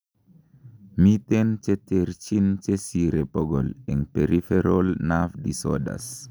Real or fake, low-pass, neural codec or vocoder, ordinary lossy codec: real; none; none; none